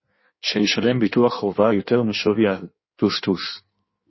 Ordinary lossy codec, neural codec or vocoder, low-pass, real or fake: MP3, 24 kbps; codec, 16 kHz in and 24 kHz out, 1.1 kbps, FireRedTTS-2 codec; 7.2 kHz; fake